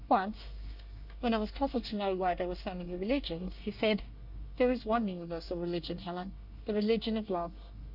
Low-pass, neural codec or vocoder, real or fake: 5.4 kHz; codec, 24 kHz, 1 kbps, SNAC; fake